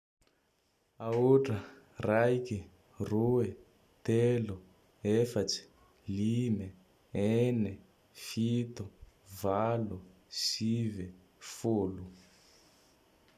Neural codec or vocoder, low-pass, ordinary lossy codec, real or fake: none; 14.4 kHz; none; real